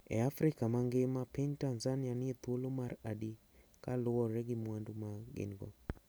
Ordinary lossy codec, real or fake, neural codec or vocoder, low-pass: none; real; none; none